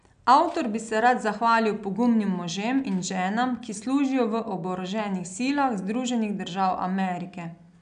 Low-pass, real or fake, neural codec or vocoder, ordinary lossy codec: 9.9 kHz; real; none; none